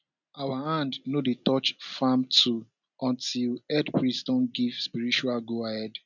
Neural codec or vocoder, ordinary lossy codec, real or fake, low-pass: none; none; real; 7.2 kHz